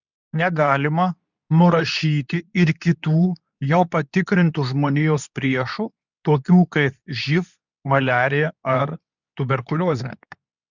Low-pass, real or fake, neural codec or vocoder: 7.2 kHz; fake; codec, 24 kHz, 0.9 kbps, WavTokenizer, medium speech release version 2